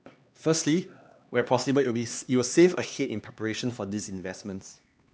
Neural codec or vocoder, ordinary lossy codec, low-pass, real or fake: codec, 16 kHz, 2 kbps, X-Codec, HuBERT features, trained on LibriSpeech; none; none; fake